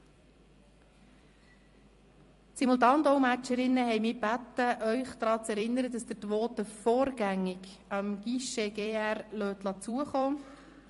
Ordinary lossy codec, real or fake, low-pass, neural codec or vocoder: MP3, 48 kbps; fake; 14.4 kHz; vocoder, 44.1 kHz, 128 mel bands every 256 samples, BigVGAN v2